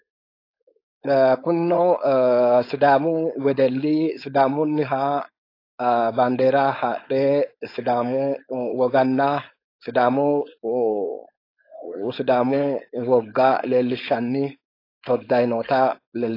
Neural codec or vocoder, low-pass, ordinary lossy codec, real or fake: codec, 16 kHz, 4.8 kbps, FACodec; 5.4 kHz; AAC, 32 kbps; fake